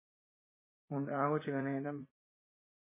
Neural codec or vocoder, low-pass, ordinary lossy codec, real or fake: vocoder, 44.1 kHz, 80 mel bands, Vocos; 3.6 kHz; MP3, 16 kbps; fake